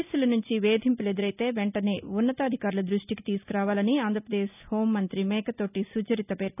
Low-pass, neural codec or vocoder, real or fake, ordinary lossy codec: 3.6 kHz; none; real; none